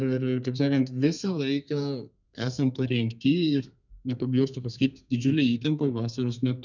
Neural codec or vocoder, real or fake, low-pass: codec, 32 kHz, 1.9 kbps, SNAC; fake; 7.2 kHz